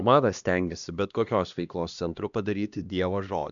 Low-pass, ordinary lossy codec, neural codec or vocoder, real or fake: 7.2 kHz; MP3, 96 kbps; codec, 16 kHz, 1 kbps, X-Codec, HuBERT features, trained on LibriSpeech; fake